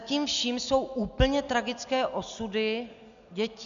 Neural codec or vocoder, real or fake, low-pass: none; real; 7.2 kHz